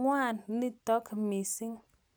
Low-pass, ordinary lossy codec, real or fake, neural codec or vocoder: none; none; real; none